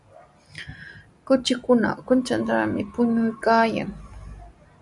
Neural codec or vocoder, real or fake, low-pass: none; real; 10.8 kHz